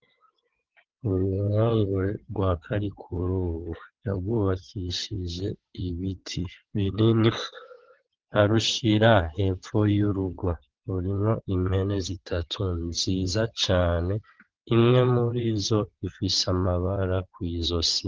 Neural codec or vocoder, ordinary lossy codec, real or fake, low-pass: vocoder, 22.05 kHz, 80 mel bands, WaveNeXt; Opus, 16 kbps; fake; 7.2 kHz